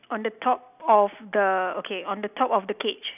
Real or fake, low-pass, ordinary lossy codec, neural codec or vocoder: real; 3.6 kHz; none; none